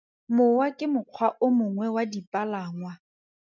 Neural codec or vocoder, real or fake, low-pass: none; real; 7.2 kHz